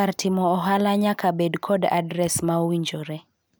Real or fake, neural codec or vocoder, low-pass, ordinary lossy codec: real; none; none; none